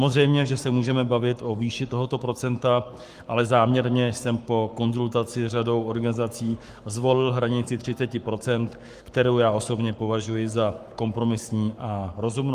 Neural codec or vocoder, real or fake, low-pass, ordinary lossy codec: codec, 44.1 kHz, 7.8 kbps, Pupu-Codec; fake; 14.4 kHz; Opus, 32 kbps